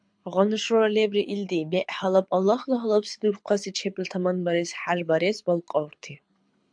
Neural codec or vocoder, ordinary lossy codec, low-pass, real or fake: codec, 24 kHz, 6 kbps, HILCodec; MP3, 64 kbps; 9.9 kHz; fake